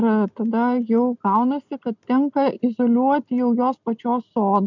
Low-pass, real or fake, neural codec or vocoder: 7.2 kHz; real; none